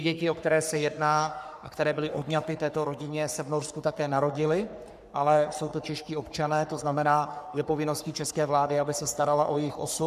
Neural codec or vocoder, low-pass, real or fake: codec, 44.1 kHz, 3.4 kbps, Pupu-Codec; 14.4 kHz; fake